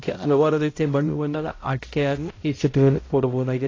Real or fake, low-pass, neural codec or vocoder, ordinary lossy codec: fake; 7.2 kHz; codec, 16 kHz, 0.5 kbps, X-Codec, HuBERT features, trained on balanced general audio; AAC, 32 kbps